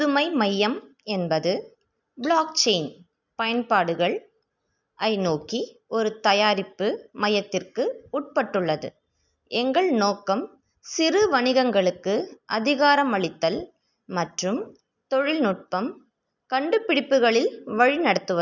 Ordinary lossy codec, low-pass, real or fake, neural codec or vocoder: none; 7.2 kHz; real; none